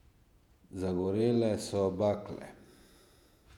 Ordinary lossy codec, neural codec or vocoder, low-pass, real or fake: none; vocoder, 48 kHz, 128 mel bands, Vocos; 19.8 kHz; fake